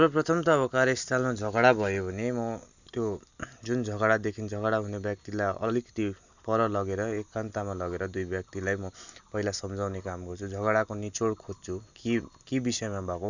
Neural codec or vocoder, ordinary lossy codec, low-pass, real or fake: none; none; 7.2 kHz; real